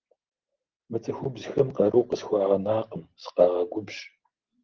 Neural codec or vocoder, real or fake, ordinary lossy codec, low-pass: none; real; Opus, 16 kbps; 7.2 kHz